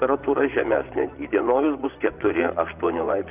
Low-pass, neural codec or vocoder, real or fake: 3.6 kHz; vocoder, 24 kHz, 100 mel bands, Vocos; fake